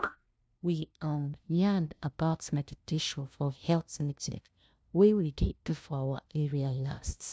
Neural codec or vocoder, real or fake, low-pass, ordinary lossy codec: codec, 16 kHz, 0.5 kbps, FunCodec, trained on LibriTTS, 25 frames a second; fake; none; none